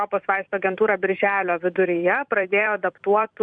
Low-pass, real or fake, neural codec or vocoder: 9.9 kHz; fake; vocoder, 44.1 kHz, 128 mel bands every 256 samples, BigVGAN v2